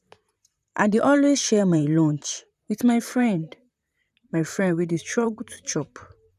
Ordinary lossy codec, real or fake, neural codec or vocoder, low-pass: none; fake; vocoder, 44.1 kHz, 128 mel bands, Pupu-Vocoder; 14.4 kHz